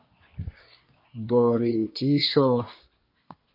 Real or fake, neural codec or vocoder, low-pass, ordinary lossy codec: fake; codec, 24 kHz, 1 kbps, SNAC; 5.4 kHz; MP3, 32 kbps